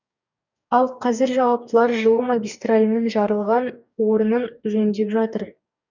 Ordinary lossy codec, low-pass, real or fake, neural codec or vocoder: none; 7.2 kHz; fake; codec, 44.1 kHz, 2.6 kbps, DAC